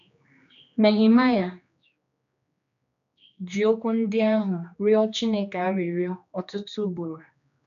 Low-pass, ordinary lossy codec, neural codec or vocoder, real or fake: 7.2 kHz; none; codec, 16 kHz, 2 kbps, X-Codec, HuBERT features, trained on general audio; fake